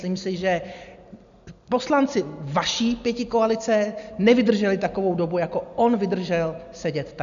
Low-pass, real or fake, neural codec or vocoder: 7.2 kHz; real; none